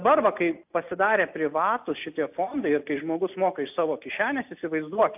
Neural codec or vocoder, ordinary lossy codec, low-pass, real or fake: none; AAC, 32 kbps; 3.6 kHz; real